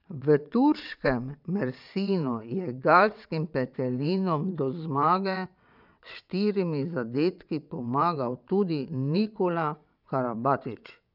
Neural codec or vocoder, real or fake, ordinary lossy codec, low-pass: vocoder, 22.05 kHz, 80 mel bands, WaveNeXt; fake; none; 5.4 kHz